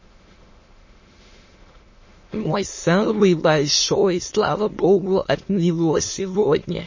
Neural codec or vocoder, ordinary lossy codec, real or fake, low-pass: autoencoder, 22.05 kHz, a latent of 192 numbers a frame, VITS, trained on many speakers; MP3, 32 kbps; fake; 7.2 kHz